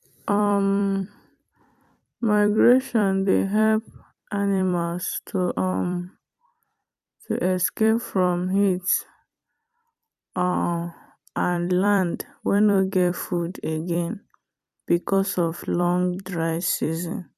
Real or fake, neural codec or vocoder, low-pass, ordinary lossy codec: fake; vocoder, 44.1 kHz, 128 mel bands every 256 samples, BigVGAN v2; 14.4 kHz; none